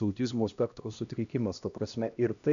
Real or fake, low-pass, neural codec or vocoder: fake; 7.2 kHz; codec, 16 kHz, 1 kbps, X-Codec, HuBERT features, trained on LibriSpeech